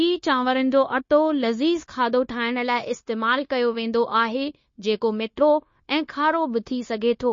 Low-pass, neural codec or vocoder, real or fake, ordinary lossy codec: 7.2 kHz; codec, 16 kHz, 0.9 kbps, LongCat-Audio-Codec; fake; MP3, 32 kbps